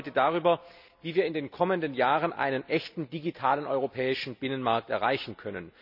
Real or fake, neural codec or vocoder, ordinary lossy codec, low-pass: real; none; none; 5.4 kHz